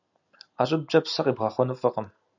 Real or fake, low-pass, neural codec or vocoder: real; 7.2 kHz; none